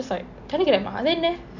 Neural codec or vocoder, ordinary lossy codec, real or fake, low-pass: none; none; real; 7.2 kHz